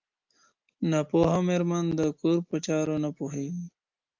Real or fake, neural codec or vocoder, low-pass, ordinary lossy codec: real; none; 7.2 kHz; Opus, 24 kbps